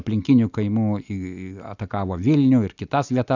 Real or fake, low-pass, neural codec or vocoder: real; 7.2 kHz; none